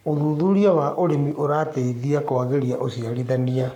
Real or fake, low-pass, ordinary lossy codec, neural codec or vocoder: fake; 19.8 kHz; none; codec, 44.1 kHz, 7.8 kbps, Pupu-Codec